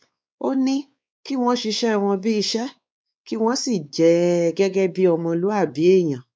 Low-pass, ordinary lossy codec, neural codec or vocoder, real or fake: none; none; codec, 16 kHz, 4 kbps, X-Codec, WavLM features, trained on Multilingual LibriSpeech; fake